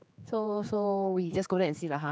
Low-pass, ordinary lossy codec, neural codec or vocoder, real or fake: none; none; codec, 16 kHz, 4 kbps, X-Codec, HuBERT features, trained on general audio; fake